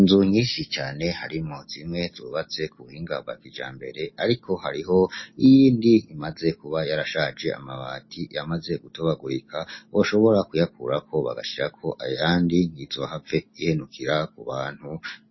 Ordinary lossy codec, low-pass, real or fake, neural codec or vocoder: MP3, 24 kbps; 7.2 kHz; real; none